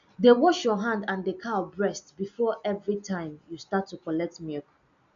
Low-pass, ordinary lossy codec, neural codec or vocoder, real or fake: 7.2 kHz; MP3, 64 kbps; none; real